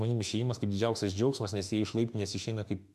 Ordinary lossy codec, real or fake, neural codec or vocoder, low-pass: MP3, 96 kbps; fake; autoencoder, 48 kHz, 32 numbers a frame, DAC-VAE, trained on Japanese speech; 14.4 kHz